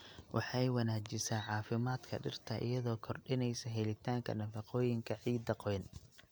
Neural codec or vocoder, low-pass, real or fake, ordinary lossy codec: none; none; real; none